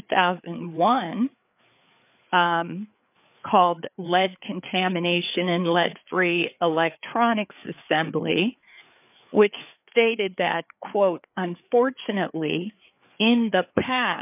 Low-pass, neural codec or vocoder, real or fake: 3.6 kHz; codec, 16 kHz, 4 kbps, FreqCodec, larger model; fake